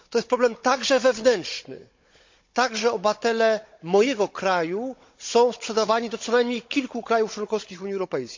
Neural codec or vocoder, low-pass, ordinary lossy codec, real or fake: codec, 16 kHz, 16 kbps, FunCodec, trained on Chinese and English, 50 frames a second; 7.2 kHz; MP3, 48 kbps; fake